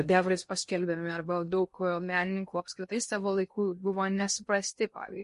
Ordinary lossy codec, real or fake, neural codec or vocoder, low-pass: MP3, 48 kbps; fake; codec, 16 kHz in and 24 kHz out, 0.6 kbps, FocalCodec, streaming, 2048 codes; 10.8 kHz